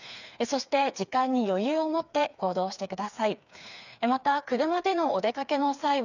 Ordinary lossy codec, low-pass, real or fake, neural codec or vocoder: none; 7.2 kHz; fake; codec, 16 kHz, 4 kbps, FreqCodec, smaller model